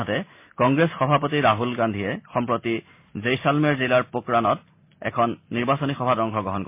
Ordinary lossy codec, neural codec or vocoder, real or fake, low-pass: MP3, 24 kbps; none; real; 3.6 kHz